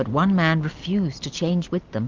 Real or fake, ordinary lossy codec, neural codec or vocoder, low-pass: real; Opus, 32 kbps; none; 7.2 kHz